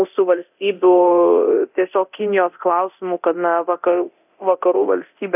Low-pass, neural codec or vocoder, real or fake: 3.6 kHz; codec, 24 kHz, 0.9 kbps, DualCodec; fake